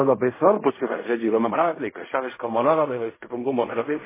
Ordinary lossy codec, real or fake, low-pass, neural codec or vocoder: MP3, 16 kbps; fake; 3.6 kHz; codec, 16 kHz in and 24 kHz out, 0.4 kbps, LongCat-Audio-Codec, fine tuned four codebook decoder